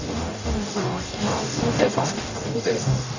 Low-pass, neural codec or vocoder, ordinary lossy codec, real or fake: 7.2 kHz; codec, 44.1 kHz, 0.9 kbps, DAC; none; fake